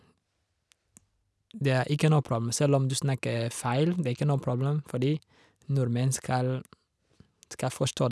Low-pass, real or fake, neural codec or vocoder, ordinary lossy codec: none; real; none; none